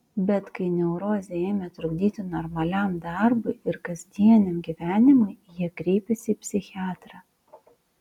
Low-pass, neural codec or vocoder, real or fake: 19.8 kHz; none; real